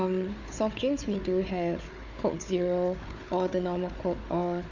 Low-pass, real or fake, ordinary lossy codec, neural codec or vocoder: 7.2 kHz; fake; none; codec, 16 kHz, 4 kbps, FunCodec, trained on Chinese and English, 50 frames a second